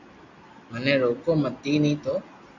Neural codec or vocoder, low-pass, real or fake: none; 7.2 kHz; real